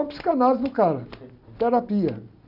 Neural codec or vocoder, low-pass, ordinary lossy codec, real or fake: none; 5.4 kHz; none; real